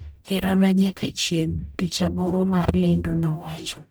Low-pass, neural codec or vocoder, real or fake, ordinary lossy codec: none; codec, 44.1 kHz, 0.9 kbps, DAC; fake; none